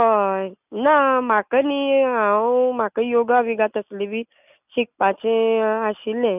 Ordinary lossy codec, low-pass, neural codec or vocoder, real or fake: none; 3.6 kHz; none; real